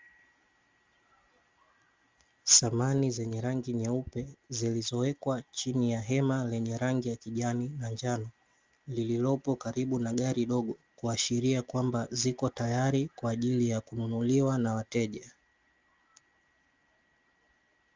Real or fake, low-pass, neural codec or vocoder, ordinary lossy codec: real; 7.2 kHz; none; Opus, 32 kbps